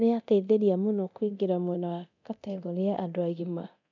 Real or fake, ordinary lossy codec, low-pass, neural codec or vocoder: fake; none; 7.2 kHz; codec, 24 kHz, 0.9 kbps, DualCodec